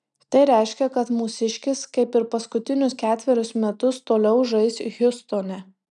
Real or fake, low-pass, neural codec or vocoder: real; 10.8 kHz; none